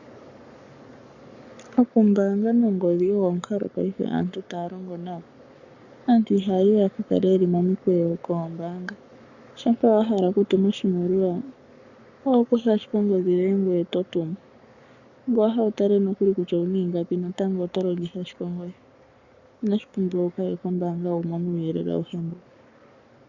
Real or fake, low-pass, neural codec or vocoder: fake; 7.2 kHz; codec, 44.1 kHz, 7.8 kbps, Pupu-Codec